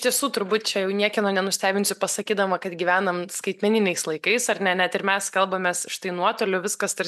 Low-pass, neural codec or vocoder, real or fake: 14.4 kHz; none; real